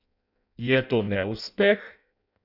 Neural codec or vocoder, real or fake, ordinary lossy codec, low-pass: codec, 16 kHz in and 24 kHz out, 0.6 kbps, FireRedTTS-2 codec; fake; none; 5.4 kHz